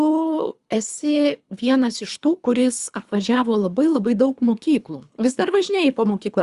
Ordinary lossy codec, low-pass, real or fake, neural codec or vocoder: Opus, 64 kbps; 10.8 kHz; fake; codec, 24 kHz, 3 kbps, HILCodec